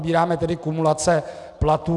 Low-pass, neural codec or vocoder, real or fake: 10.8 kHz; none; real